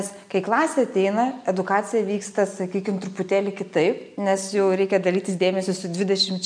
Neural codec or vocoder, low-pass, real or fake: none; 9.9 kHz; real